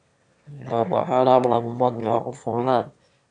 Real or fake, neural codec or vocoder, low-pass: fake; autoencoder, 22.05 kHz, a latent of 192 numbers a frame, VITS, trained on one speaker; 9.9 kHz